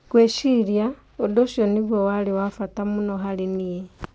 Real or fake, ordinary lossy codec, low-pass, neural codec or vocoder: real; none; none; none